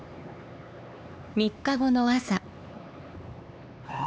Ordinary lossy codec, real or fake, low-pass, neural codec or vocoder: none; fake; none; codec, 16 kHz, 4 kbps, X-Codec, HuBERT features, trained on LibriSpeech